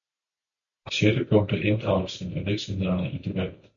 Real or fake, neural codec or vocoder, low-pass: real; none; 7.2 kHz